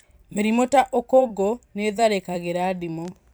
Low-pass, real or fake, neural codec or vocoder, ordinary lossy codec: none; fake; vocoder, 44.1 kHz, 128 mel bands every 256 samples, BigVGAN v2; none